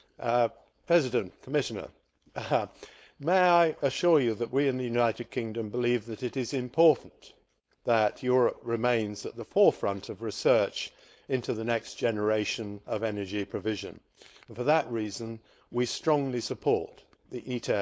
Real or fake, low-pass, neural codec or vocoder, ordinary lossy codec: fake; none; codec, 16 kHz, 4.8 kbps, FACodec; none